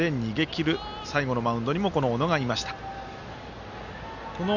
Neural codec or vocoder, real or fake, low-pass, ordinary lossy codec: none; real; 7.2 kHz; none